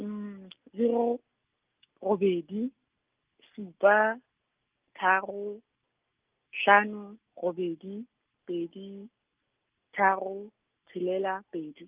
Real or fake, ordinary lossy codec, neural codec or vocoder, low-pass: real; Opus, 24 kbps; none; 3.6 kHz